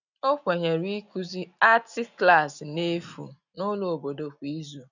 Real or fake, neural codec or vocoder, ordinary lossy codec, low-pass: real; none; none; 7.2 kHz